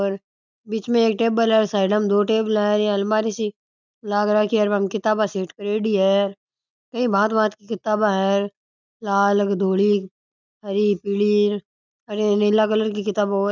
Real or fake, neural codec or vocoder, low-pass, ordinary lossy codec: real; none; 7.2 kHz; none